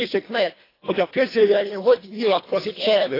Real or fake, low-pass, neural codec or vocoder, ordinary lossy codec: fake; 5.4 kHz; codec, 24 kHz, 1.5 kbps, HILCodec; AAC, 24 kbps